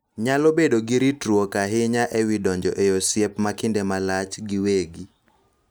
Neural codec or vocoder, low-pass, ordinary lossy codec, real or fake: none; none; none; real